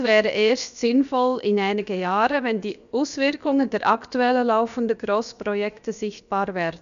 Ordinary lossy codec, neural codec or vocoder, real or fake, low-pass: none; codec, 16 kHz, about 1 kbps, DyCAST, with the encoder's durations; fake; 7.2 kHz